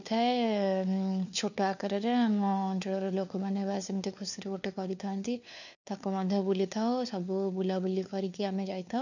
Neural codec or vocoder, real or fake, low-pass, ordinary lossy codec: codec, 16 kHz, 4 kbps, FunCodec, trained on LibriTTS, 50 frames a second; fake; 7.2 kHz; none